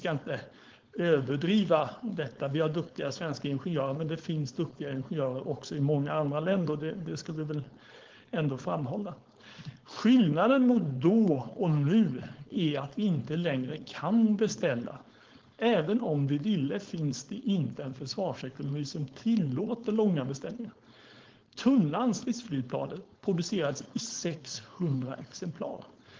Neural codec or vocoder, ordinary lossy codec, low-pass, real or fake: codec, 16 kHz, 4.8 kbps, FACodec; Opus, 16 kbps; 7.2 kHz; fake